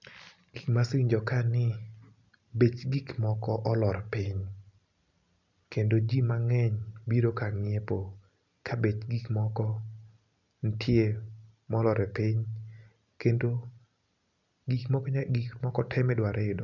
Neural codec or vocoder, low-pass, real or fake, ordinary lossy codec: none; 7.2 kHz; real; none